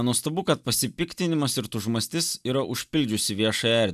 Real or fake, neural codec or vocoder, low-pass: real; none; 14.4 kHz